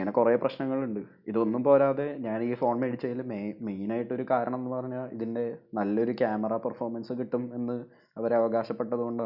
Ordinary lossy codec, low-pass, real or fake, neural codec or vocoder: none; 5.4 kHz; real; none